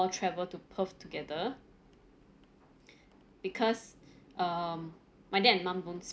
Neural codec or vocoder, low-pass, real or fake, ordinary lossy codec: none; none; real; none